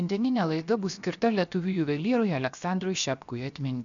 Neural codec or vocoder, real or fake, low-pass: codec, 16 kHz, 0.7 kbps, FocalCodec; fake; 7.2 kHz